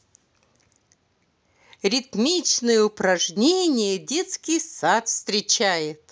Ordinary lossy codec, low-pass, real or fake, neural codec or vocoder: none; none; real; none